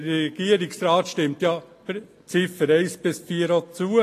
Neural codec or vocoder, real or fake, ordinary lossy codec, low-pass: none; real; AAC, 48 kbps; 14.4 kHz